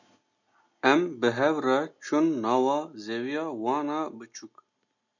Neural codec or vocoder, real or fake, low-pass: none; real; 7.2 kHz